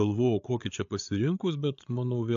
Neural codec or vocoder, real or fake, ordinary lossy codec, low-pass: codec, 16 kHz, 8 kbps, FreqCodec, larger model; fake; AAC, 64 kbps; 7.2 kHz